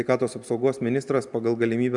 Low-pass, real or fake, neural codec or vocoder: 10.8 kHz; real; none